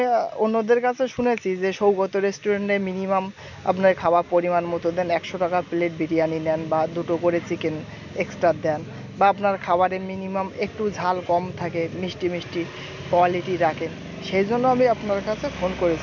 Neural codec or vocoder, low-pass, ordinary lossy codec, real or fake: none; 7.2 kHz; none; real